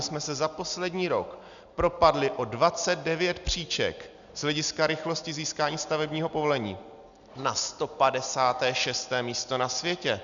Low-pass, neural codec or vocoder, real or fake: 7.2 kHz; none; real